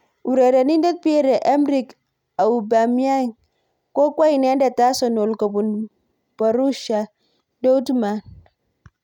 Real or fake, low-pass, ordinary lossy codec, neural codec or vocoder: real; 19.8 kHz; none; none